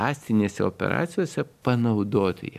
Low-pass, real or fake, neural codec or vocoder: 14.4 kHz; real; none